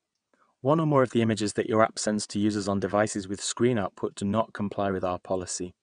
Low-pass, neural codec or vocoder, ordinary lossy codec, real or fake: 9.9 kHz; vocoder, 22.05 kHz, 80 mel bands, WaveNeXt; none; fake